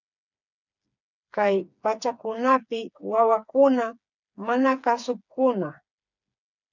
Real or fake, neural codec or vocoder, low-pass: fake; codec, 16 kHz, 4 kbps, FreqCodec, smaller model; 7.2 kHz